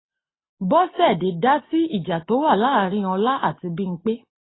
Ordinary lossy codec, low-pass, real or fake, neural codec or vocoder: AAC, 16 kbps; 7.2 kHz; real; none